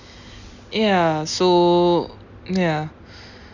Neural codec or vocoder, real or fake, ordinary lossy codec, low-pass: none; real; Opus, 64 kbps; 7.2 kHz